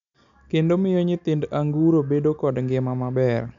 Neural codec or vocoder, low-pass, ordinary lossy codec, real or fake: none; 7.2 kHz; none; real